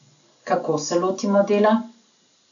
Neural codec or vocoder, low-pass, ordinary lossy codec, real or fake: none; 7.2 kHz; none; real